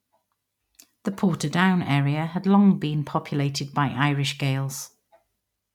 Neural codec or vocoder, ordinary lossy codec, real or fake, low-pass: none; none; real; 19.8 kHz